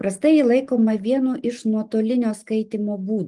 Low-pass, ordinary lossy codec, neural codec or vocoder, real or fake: 10.8 kHz; Opus, 24 kbps; none; real